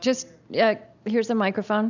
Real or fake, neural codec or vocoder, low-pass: real; none; 7.2 kHz